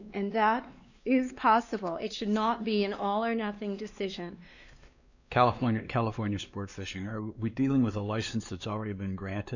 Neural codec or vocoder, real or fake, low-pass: codec, 16 kHz, 2 kbps, X-Codec, WavLM features, trained on Multilingual LibriSpeech; fake; 7.2 kHz